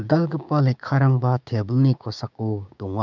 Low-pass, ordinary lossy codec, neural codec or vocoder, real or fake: 7.2 kHz; none; codec, 16 kHz, 6 kbps, DAC; fake